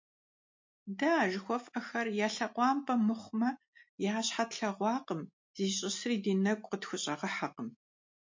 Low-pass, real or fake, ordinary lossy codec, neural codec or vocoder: 7.2 kHz; real; MP3, 48 kbps; none